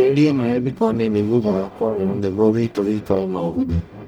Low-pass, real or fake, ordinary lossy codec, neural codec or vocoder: none; fake; none; codec, 44.1 kHz, 0.9 kbps, DAC